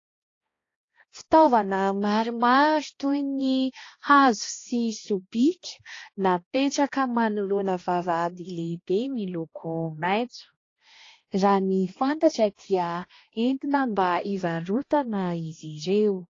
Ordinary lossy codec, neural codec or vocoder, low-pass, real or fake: AAC, 32 kbps; codec, 16 kHz, 1 kbps, X-Codec, HuBERT features, trained on balanced general audio; 7.2 kHz; fake